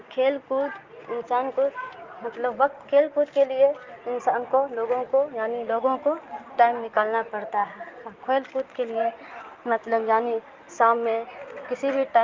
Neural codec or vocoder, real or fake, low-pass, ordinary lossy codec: none; real; 7.2 kHz; Opus, 32 kbps